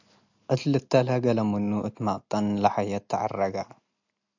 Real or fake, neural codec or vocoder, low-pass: real; none; 7.2 kHz